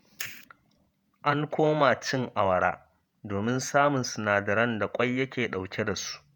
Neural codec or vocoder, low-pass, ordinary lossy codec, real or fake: vocoder, 48 kHz, 128 mel bands, Vocos; none; none; fake